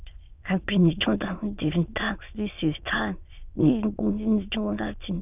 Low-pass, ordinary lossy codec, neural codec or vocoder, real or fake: 3.6 kHz; none; autoencoder, 22.05 kHz, a latent of 192 numbers a frame, VITS, trained on many speakers; fake